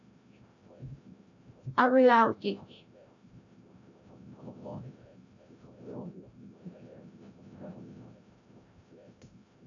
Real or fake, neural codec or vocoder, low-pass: fake; codec, 16 kHz, 0.5 kbps, FreqCodec, larger model; 7.2 kHz